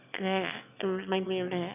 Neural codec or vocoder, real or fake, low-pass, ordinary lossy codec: autoencoder, 22.05 kHz, a latent of 192 numbers a frame, VITS, trained on one speaker; fake; 3.6 kHz; none